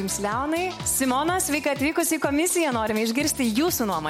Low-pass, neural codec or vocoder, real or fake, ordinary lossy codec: 19.8 kHz; none; real; MP3, 64 kbps